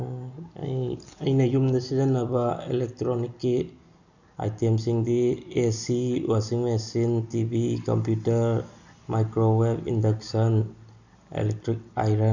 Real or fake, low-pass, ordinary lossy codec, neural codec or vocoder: real; 7.2 kHz; none; none